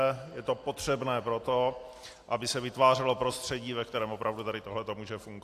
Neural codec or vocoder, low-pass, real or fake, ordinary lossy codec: none; 14.4 kHz; real; AAC, 64 kbps